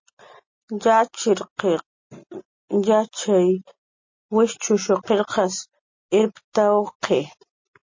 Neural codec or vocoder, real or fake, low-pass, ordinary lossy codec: none; real; 7.2 kHz; MP3, 32 kbps